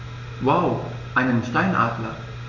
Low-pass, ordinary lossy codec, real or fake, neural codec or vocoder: 7.2 kHz; none; real; none